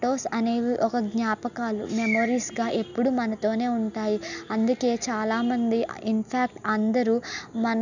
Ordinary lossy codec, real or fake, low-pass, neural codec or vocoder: none; real; 7.2 kHz; none